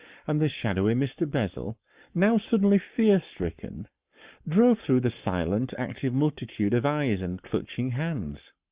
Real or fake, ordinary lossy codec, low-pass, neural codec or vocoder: fake; Opus, 64 kbps; 3.6 kHz; codec, 44.1 kHz, 7.8 kbps, Pupu-Codec